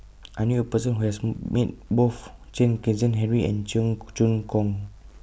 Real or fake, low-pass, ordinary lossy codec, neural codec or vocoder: real; none; none; none